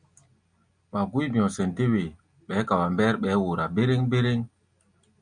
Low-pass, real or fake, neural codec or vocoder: 9.9 kHz; real; none